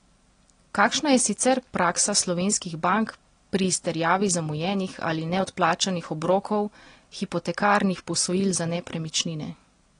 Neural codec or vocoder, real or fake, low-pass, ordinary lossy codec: none; real; 9.9 kHz; AAC, 32 kbps